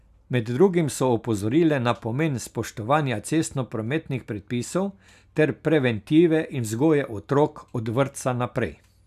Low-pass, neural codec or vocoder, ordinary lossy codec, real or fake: 14.4 kHz; none; none; real